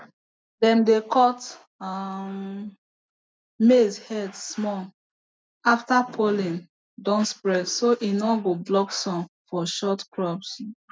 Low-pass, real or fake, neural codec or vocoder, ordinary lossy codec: none; real; none; none